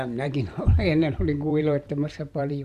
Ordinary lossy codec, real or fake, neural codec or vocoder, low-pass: none; fake; vocoder, 44.1 kHz, 128 mel bands every 512 samples, BigVGAN v2; 14.4 kHz